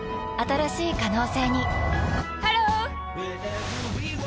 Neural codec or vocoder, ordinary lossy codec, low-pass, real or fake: none; none; none; real